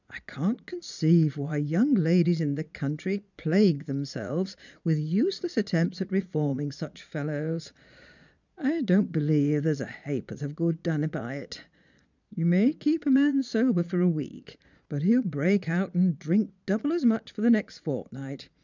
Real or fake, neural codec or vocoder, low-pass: fake; vocoder, 22.05 kHz, 80 mel bands, Vocos; 7.2 kHz